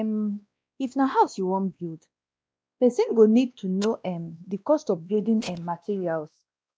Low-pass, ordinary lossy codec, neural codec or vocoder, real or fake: none; none; codec, 16 kHz, 1 kbps, X-Codec, WavLM features, trained on Multilingual LibriSpeech; fake